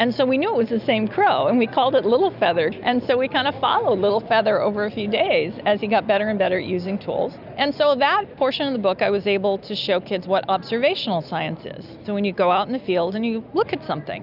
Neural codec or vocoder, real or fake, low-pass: none; real; 5.4 kHz